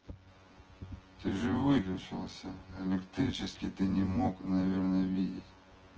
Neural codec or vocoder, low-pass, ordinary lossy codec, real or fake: vocoder, 24 kHz, 100 mel bands, Vocos; 7.2 kHz; Opus, 16 kbps; fake